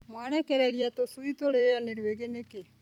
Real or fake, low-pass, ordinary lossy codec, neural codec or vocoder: fake; 19.8 kHz; none; vocoder, 44.1 kHz, 128 mel bands every 256 samples, BigVGAN v2